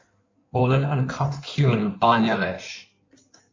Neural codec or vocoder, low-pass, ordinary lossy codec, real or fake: codec, 16 kHz in and 24 kHz out, 1.1 kbps, FireRedTTS-2 codec; 7.2 kHz; MP3, 64 kbps; fake